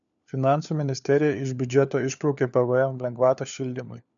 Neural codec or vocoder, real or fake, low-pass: codec, 16 kHz, 4 kbps, FunCodec, trained on LibriTTS, 50 frames a second; fake; 7.2 kHz